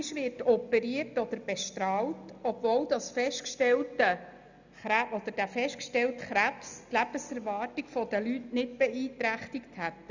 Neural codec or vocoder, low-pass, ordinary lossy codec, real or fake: none; 7.2 kHz; none; real